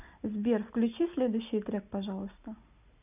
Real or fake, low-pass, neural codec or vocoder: real; 3.6 kHz; none